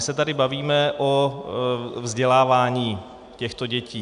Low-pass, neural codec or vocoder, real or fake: 10.8 kHz; none; real